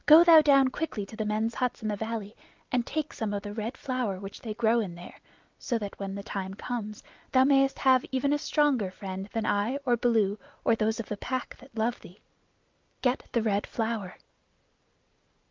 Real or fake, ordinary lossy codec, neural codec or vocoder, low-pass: real; Opus, 24 kbps; none; 7.2 kHz